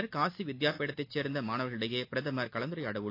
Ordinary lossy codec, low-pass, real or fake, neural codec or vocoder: AAC, 32 kbps; 5.4 kHz; real; none